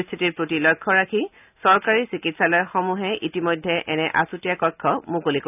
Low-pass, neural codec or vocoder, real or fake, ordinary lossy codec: 3.6 kHz; none; real; none